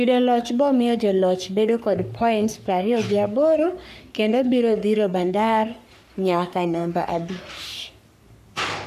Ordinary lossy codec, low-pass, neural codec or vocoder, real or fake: none; 14.4 kHz; codec, 44.1 kHz, 3.4 kbps, Pupu-Codec; fake